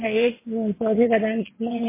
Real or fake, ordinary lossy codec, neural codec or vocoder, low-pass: fake; MP3, 16 kbps; vocoder, 22.05 kHz, 80 mel bands, WaveNeXt; 3.6 kHz